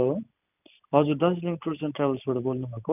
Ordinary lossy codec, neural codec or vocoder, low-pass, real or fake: none; none; 3.6 kHz; real